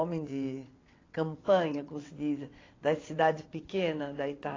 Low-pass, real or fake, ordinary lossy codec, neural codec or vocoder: 7.2 kHz; fake; AAC, 32 kbps; vocoder, 22.05 kHz, 80 mel bands, WaveNeXt